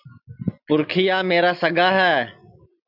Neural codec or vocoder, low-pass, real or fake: none; 5.4 kHz; real